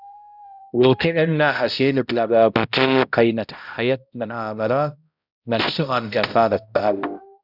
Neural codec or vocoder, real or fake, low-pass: codec, 16 kHz, 0.5 kbps, X-Codec, HuBERT features, trained on balanced general audio; fake; 5.4 kHz